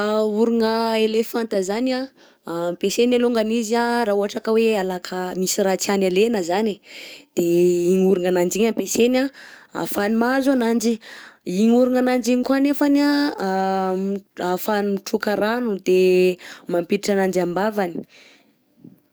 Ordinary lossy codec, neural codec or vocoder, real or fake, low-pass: none; codec, 44.1 kHz, 7.8 kbps, DAC; fake; none